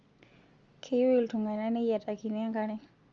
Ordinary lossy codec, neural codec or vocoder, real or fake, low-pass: Opus, 32 kbps; none; real; 7.2 kHz